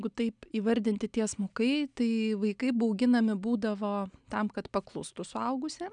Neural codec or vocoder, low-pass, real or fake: none; 9.9 kHz; real